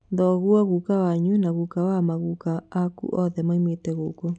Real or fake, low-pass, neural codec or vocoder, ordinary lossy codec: real; 9.9 kHz; none; none